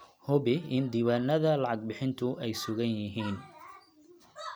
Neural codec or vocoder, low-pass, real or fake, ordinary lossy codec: none; none; real; none